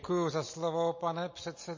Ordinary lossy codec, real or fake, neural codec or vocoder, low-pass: MP3, 32 kbps; real; none; 7.2 kHz